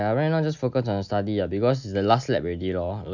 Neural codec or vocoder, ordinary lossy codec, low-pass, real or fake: none; none; 7.2 kHz; real